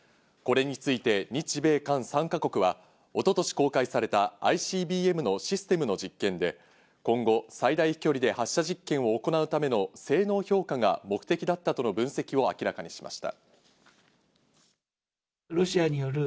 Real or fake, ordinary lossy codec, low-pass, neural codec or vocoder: real; none; none; none